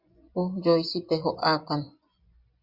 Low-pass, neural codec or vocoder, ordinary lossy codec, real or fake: 5.4 kHz; vocoder, 24 kHz, 100 mel bands, Vocos; Opus, 64 kbps; fake